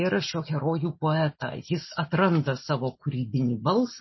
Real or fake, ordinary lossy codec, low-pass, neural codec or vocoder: real; MP3, 24 kbps; 7.2 kHz; none